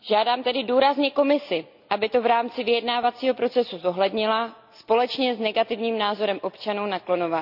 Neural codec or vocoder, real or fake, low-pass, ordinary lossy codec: none; real; 5.4 kHz; none